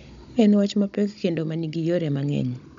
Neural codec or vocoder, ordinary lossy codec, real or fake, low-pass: codec, 16 kHz, 16 kbps, FunCodec, trained on Chinese and English, 50 frames a second; MP3, 64 kbps; fake; 7.2 kHz